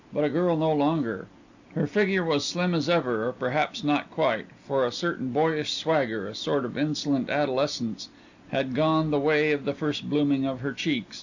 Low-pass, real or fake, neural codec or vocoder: 7.2 kHz; real; none